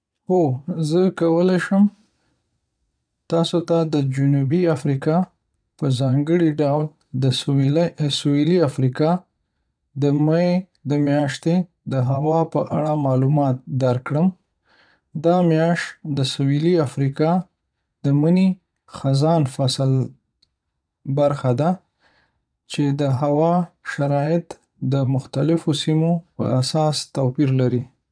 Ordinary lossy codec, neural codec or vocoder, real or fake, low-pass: none; vocoder, 22.05 kHz, 80 mel bands, Vocos; fake; 9.9 kHz